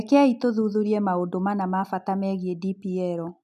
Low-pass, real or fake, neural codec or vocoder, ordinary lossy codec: 14.4 kHz; real; none; none